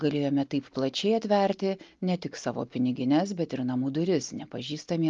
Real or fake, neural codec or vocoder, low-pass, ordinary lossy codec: real; none; 7.2 kHz; Opus, 32 kbps